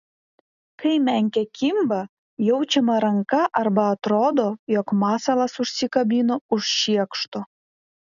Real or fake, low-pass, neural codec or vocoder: real; 7.2 kHz; none